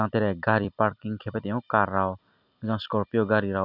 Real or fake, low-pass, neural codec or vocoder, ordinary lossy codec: fake; 5.4 kHz; vocoder, 44.1 kHz, 128 mel bands every 256 samples, BigVGAN v2; none